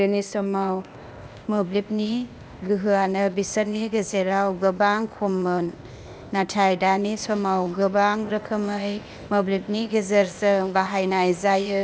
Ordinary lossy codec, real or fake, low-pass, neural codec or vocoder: none; fake; none; codec, 16 kHz, 0.8 kbps, ZipCodec